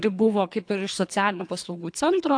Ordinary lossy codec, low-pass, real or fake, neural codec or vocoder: AAC, 64 kbps; 9.9 kHz; fake; codec, 24 kHz, 3 kbps, HILCodec